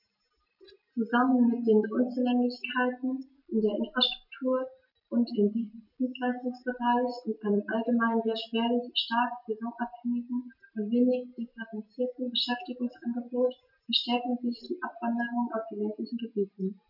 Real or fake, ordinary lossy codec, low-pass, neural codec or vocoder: real; none; 5.4 kHz; none